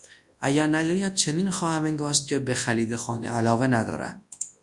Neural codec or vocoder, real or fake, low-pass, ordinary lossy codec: codec, 24 kHz, 0.9 kbps, WavTokenizer, large speech release; fake; 10.8 kHz; Opus, 64 kbps